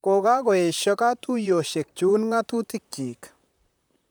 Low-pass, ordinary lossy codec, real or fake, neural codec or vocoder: none; none; fake; vocoder, 44.1 kHz, 128 mel bands, Pupu-Vocoder